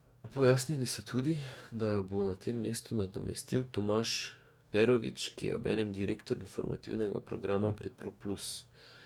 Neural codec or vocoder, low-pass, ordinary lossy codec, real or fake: codec, 44.1 kHz, 2.6 kbps, DAC; 19.8 kHz; none; fake